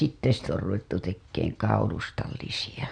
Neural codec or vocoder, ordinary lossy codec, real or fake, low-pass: none; none; real; 9.9 kHz